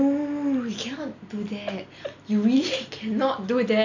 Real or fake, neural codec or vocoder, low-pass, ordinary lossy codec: real; none; 7.2 kHz; none